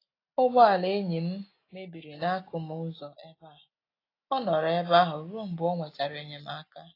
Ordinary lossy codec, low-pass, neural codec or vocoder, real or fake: AAC, 24 kbps; 5.4 kHz; none; real